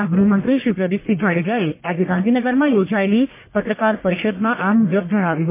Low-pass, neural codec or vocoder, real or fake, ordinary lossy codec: 3.6 kHz; codec, 44.1 kHz, 1.7 kbps, Pupu-Codec; fake; MP3, 24 kbps